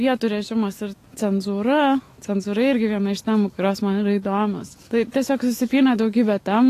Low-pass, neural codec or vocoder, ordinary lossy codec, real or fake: 14.4 kHz; autoencoder, 48 kHz, 128 numbers a frame, DAC-VAE, trained on Japanese speech; AAC, 48 kbps; fake